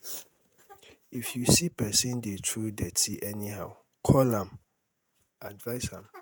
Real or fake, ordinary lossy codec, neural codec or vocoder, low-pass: fake; none; vocoder, 48 kHz, 128 mel bands, Vocos; none